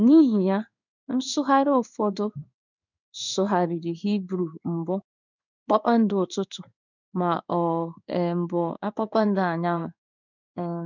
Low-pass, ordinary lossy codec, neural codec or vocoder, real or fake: 7.2 kHz; none; codec, 16 kHz in and 24 kHz out, 1 kbps, XY-Tokenizer; fake